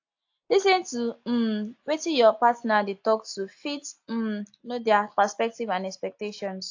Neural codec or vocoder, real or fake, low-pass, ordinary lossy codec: none; real; 7.2 kHz; AAC, 48 kbps